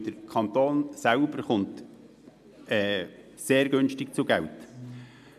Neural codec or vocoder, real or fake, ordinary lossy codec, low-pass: none; real; MP3, 96 kbps; 14.4 kHz